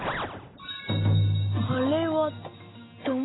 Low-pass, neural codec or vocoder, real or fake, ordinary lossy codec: 7.2 kHz; none; real; AAC, 16 kbps